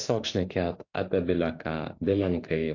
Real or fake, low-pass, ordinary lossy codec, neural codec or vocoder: fake; 7.2 kHz; AAC, 48 kbps; autoencoder, 48 kHz, 32 numbers a frame, DAC-VAE, trained on Japanese speech